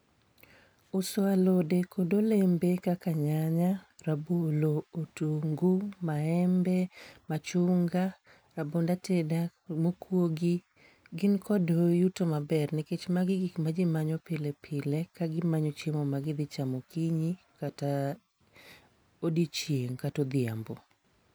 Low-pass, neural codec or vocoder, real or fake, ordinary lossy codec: none; none; real; none